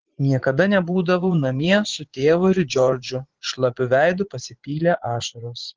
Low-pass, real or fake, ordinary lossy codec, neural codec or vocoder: 7.2 kHz; fake; Opus, 16 kbps; vocoder, 44.1 kHz, 80 mel bands, Vocos